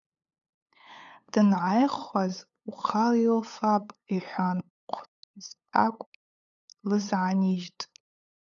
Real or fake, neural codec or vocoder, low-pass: fake; codec, 16 kHz, 8 kbps, FunCodec, trained on LibriTTS, 25 frames a second; 7.2 kHz